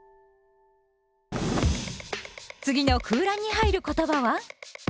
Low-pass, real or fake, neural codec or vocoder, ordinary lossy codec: none; real; none; none